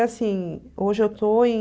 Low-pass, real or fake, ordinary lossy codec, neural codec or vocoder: none; real; none; none